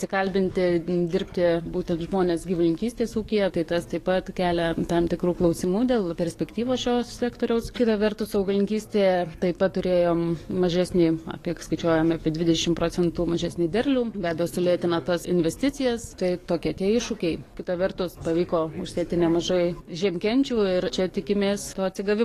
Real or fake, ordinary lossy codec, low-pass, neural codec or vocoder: fake; AAC, 48 kbps; 14.4 kHz; codec, 44.1 kHz, 7.8 kbps, DAC